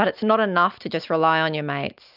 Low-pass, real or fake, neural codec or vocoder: 5.4 kHz; real; none